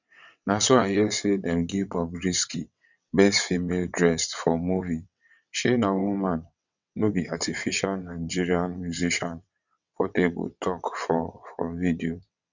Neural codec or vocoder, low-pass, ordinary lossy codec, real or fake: vocoder, 22.05 kHz, 80 mel bands, WaveNeXt; 7.2 kHz; none; fake